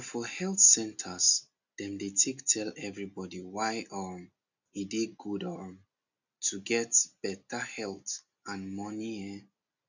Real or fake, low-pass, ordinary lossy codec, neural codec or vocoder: real; 7.2 kHz; none; none